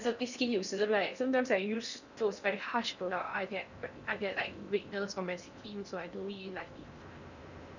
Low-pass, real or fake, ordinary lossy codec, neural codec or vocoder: 7.2 kHz; fake; none; codec, 16 kHz in and 24 kHz out, 0.6 kbps, FocalCodec, streaming, 4096 codes